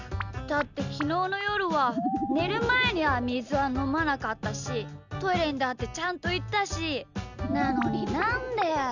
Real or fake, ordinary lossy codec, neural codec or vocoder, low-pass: real; none; none; 7.2 kHz